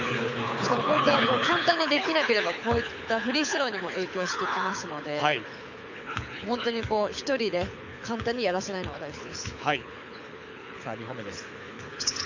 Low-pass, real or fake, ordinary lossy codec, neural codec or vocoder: 7.2 kHz; fake; none; codec, 24 kHz, 6 kbps, HILCodec